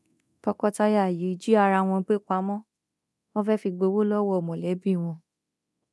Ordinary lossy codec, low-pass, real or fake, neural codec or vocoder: none; none; fake; codec, 24 kHz, 0.9 kbps, DualCodec